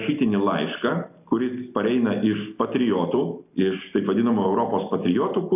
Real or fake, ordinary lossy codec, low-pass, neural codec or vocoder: real; AAC, 32 kbps; 3.6 kHz; none